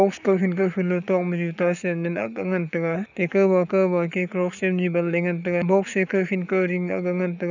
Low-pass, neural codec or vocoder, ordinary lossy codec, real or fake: 7.2 kHz; codec, 44.1 kHz, 7.8 kbps, Pupu-Codec; none; fake